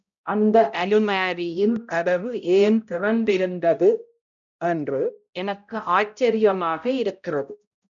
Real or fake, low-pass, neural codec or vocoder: fake; 7.2 kHz; codec, 16 kHz, 0.5 kbps, X-Codec, HuBERT features, trained on balanced general audio